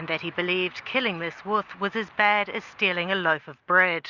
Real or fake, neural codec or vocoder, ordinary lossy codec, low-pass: real; none; Opus, 64 kbps; 7.2 kHz